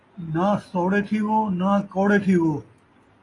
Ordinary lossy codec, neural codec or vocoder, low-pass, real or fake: AAC, 32 kbps; none; 10.8 kHz; real